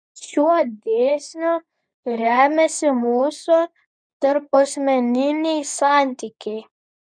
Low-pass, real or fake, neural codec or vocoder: 9.9 kHz; fake; codec, 24 kHz, 0.9 kbps, WavTokenizer, medium speech release version 2